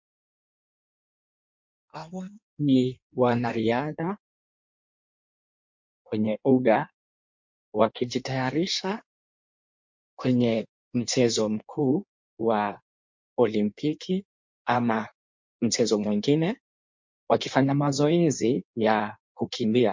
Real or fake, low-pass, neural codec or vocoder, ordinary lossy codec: fake; 7.2 kHz; codec, 16 kHz in and 24 kHz out, 1.1 kbps, FireRedTTS-2 codec; MP3, 48 kbps